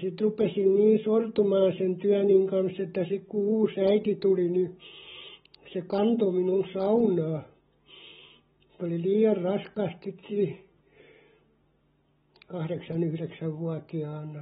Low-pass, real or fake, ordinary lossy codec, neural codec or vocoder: 14.4 kHz; real; AAC, 16 kbps; none